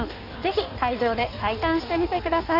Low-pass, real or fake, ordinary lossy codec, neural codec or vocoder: 5.4 kHz; fake; none; codec, 16 kHz in and 24 kHz out, 1.1 kbps, FireRedTTS-2 codec